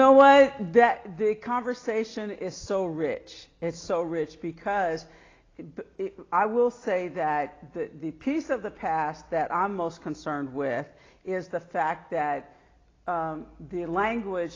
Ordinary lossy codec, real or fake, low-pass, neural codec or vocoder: AAC, 32 kbps; real; 7.2 kHz; none